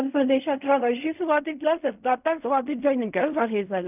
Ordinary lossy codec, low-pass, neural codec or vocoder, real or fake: none; 3.6 kHz; codec, 16 kHz in and 24 kHz out, 0.4 kbps, LongCat-Audio-Codec, fine tuned four codebook decoder; fake